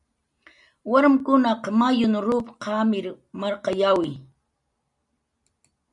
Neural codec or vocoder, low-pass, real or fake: none; 10.8 kHz; real